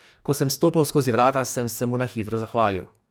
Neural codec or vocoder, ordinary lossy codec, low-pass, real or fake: codec, 44.1 kHz, 2.6 kbps, DAC; none; none; fake